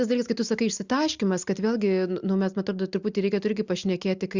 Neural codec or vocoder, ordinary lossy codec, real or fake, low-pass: none; Opus, 64 kbps; real; 7.2 kHz